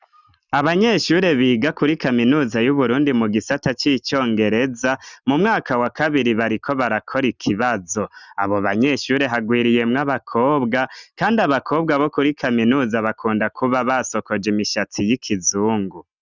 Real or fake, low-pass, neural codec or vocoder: real; 7.2 kHz; none